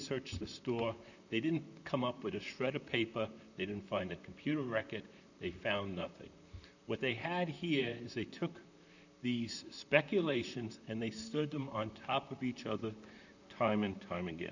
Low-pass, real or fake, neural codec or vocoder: 7.2 kHz; fake; vocoder, 44.1 kHz, 128 mel bands, Pupu-Vocoder